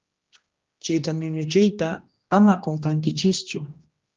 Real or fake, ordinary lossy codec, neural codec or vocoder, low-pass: fake; Opus, 32 kbps; codec, 16 kHz, 1 kbps, X-Codec, HuBERT features, trained on general audio; 7.2 kHz